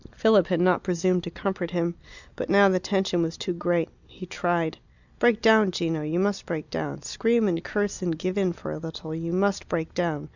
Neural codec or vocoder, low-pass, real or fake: none; 7.2 kHz; real